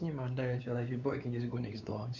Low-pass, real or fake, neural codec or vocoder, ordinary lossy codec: 7.2 kHz; fake; codec, 16 kHz, 4 kbps, X-Codec, WavLM features, trained on Multilingual LibriSpeech; none